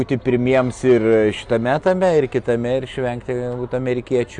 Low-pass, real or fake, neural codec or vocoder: 9.9 kHz; real; none